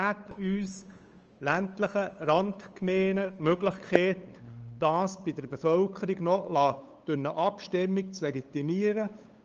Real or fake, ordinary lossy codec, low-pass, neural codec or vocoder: fake; Opus, 24 kbps; 7.2 kHz; codec, 16 kHz, 8 kbps, FunCodec, trained on LibriTTS, 25 frames a second